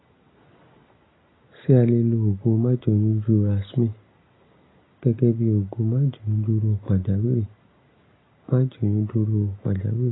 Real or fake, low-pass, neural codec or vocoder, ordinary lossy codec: real; 7.2 kHz; none; AAC, 16 kbps